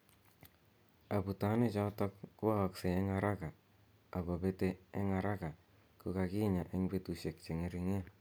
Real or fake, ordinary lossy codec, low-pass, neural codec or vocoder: real; none; none; none